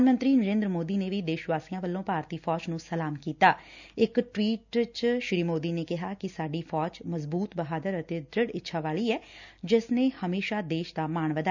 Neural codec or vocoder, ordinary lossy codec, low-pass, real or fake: none; none; 7.2 kHz; real